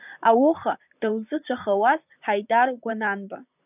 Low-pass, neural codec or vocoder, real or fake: 3.6 kHz; vocoder, 44.1 kHz, 128 mel bands, Pupu-Vocoder; fake